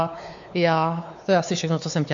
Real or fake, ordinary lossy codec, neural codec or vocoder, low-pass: fake; AAC, 64 kbps; codec, 16 kHz, 4 kbps, X-Codec, WavLM features, trained on Multilingual LibriSpeech; 7.2 kHz